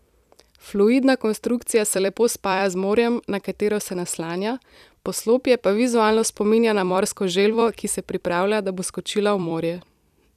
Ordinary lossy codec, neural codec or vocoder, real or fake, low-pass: none; vocoder, 44.1 kHz, 128 mel bands every 256 samples, BigVGAN v2; fake; 14.4 kHz